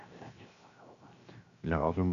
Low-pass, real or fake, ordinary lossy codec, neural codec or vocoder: 7.2 kHz; fake; Opus, 64 kbps; codec, 16 kHz, 0.7 kbps, FocalCodec